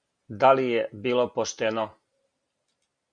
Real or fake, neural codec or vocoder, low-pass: real; none; 9.9 kHz